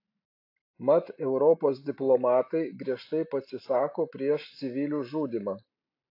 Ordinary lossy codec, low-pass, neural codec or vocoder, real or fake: AAC, 32 kbps; 5.4 kHz; none; real